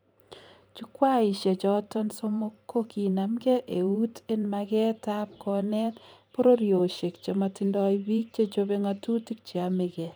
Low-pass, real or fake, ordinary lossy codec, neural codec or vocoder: none; fake; none; vocoder, 44.1 kHz, 128 mel bands every 512 samples, BigVGAN v2